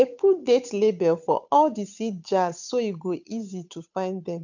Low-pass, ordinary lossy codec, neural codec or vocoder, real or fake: 7.2 kHz; none; codec, 16 kHz, 8 kbps, FunCodec, trained on Chinese and English, 25 frames a second; fake